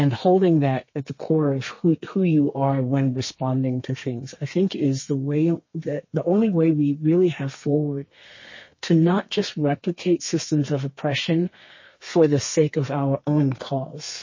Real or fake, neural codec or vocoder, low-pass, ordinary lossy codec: fake; codec, 32 kHz, 1.9 kbps, SNAC; 7.2 kHz; MP3, 32 kbps